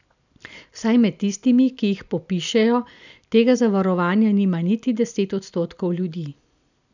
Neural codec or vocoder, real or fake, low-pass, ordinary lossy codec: none; real; 7.2 kHz; none